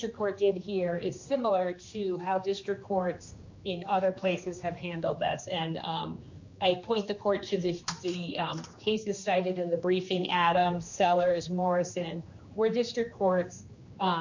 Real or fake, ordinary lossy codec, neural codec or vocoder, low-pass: fake; MP3, 48 kbps; codec, 16 kHz, 2 kbps, X-Codec, HuBERT features, trained on general audio; 7.2 kHz